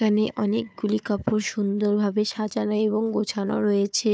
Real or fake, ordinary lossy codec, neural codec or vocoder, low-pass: fake; none; codec, 16 kHz, 4 kbps, FunCodec, trained on Chinese and English, 50 frames a second; none